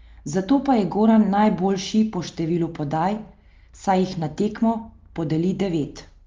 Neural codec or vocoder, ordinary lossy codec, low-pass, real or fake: none; Opus, 32 kbps; 7.2 kHz; real